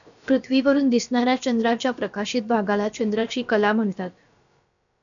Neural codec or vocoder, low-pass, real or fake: codec, 16 kHz, about 1 kbps, DyCAST, with the encoder's durations; 7.2 kHz; fake